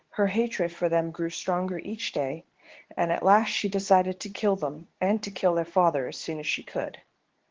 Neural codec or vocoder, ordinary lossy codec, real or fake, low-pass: codec, 24 kHz, 0.9 kbps, WavTokenizer, medium speech release version 2; Opus, 16 kbps; fake; 7.2 kHz